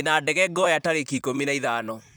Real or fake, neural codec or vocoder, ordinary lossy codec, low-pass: fake; vocoder, 44.1 kHz, 128 mel bands, Pupu-Vocoder; none; none